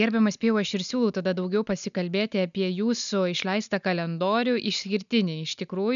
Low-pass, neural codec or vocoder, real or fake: 7.2 kHz; none; real